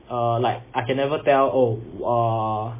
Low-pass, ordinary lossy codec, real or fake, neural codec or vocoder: 3.6 kHz; MP3, 16 kbps; real; none